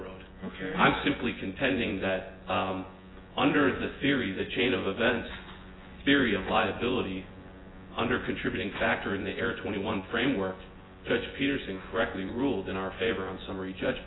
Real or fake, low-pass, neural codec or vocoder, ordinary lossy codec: fake; 7.2 kHz; vocoder, 24 kHz, 100 mel bands, Vocos; AAC, 16 kbps